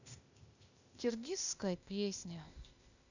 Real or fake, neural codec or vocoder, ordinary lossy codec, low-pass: fake; codec, 16 kHz, 0.8 kbps, ZipCodec; none; 7.2 kHz